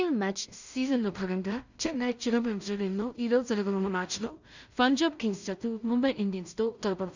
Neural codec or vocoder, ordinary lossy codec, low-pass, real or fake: codec, 16 kHz in and 24 kHz out, 0.4 kbps, LongCat-Audio-Codec, two codebook decoder; none; 7.2 kHz; fake